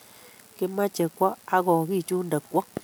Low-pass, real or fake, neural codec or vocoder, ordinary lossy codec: none; real; none; none